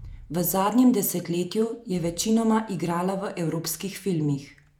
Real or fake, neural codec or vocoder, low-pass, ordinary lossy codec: fake; vocoder, 48 kHz, 128 mel bands, Vocos; 19.8 kHz; none